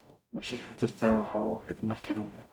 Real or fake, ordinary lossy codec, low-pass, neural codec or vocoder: fake; none; 19.8 kHz; codec, 44.1 kHz, 0.9 kbps, DAC